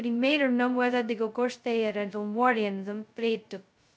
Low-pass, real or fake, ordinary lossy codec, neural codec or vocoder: none; fake; none; codec, 16 kHz, 0.2 kbps, FocalCodec